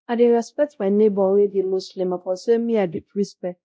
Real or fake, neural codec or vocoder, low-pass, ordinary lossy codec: fake; codec, 16 kHz, 0.5 kbps, X-Codec, WavLM features, trained on Multilingual LibriSpeech; none; none